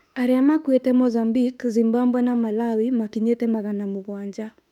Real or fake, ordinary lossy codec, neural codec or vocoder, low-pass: fake; none; autoencoder, 48 kHz, 32 numbers a frame, DAC-VAE, trained on Japanese speech; 19.8 kHz